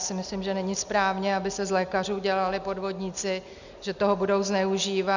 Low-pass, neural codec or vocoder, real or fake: 7.2 kHz; none; real